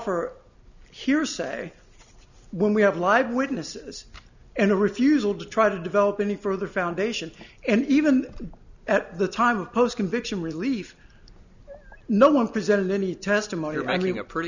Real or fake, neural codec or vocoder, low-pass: real; none; 7.2 kHz